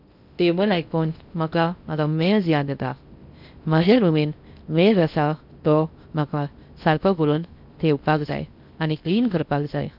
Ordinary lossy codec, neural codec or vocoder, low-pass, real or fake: none; codec, 16 kHz in and 24 kHz out, 0.6 kbps, FocalCodec, streaming, 2048 codes; 5.4 kHz; fake